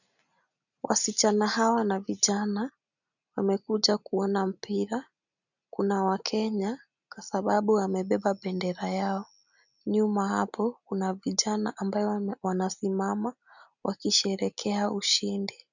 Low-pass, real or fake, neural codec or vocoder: 7.2 kHz; real; none